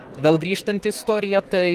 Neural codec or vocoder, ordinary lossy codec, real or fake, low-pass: codec, 44.1 kHz, 2.6 kbps, SNAC; Opus, 24 kbps; fake; 14.4 kHz